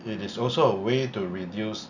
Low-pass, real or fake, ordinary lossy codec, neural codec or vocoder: 7.2 kHz; real; none; none